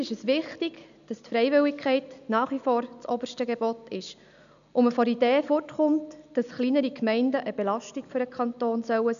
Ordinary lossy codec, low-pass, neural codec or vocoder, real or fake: none; 7.2 kHz; none; real